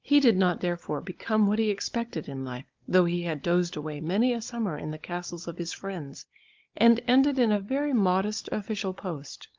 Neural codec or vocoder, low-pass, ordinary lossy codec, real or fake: codec, 16 kHz, 16 kbps, FunCodec, trained on Chinese and English, 50 frames a second; 7.2 kHz; Opus, 24 kbps; fake